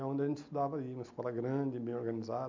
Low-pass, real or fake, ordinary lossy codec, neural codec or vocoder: 7.2 kHz; real; MP3, 64 kbps; none